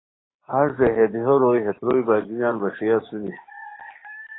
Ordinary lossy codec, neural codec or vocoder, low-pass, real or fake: AAC, 16 kbps; codec, 16 kHz, 6 kbps, DAC; 7.2 kHz; fake